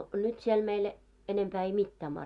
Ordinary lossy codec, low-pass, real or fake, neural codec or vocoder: Opus, 64 kbps; 10.8 kHz; real; none